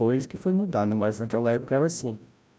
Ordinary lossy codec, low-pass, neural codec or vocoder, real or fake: none; none; codec, 16 kHz, 0.5 kbps, FreqCodec, larger model; fake